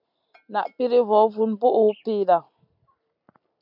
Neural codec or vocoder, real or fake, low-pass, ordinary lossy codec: none; real; 5.4 kHz; MP3, 48 kbps